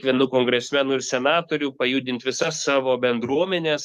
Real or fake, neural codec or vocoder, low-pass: fake; codec, 44.1 kHz, 7.8 kbps, DAC; 14.4 kHz